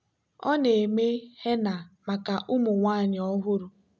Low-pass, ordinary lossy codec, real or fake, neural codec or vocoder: none; none; real; none